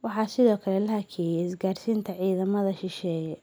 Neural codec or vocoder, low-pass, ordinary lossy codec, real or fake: none; none; none; real